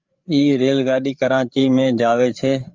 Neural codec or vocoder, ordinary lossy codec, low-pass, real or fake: codec, 16 kHz, 8 kbps, FreqCodec, larger model; Opus, 32 kbps; 7.2 kHz; fake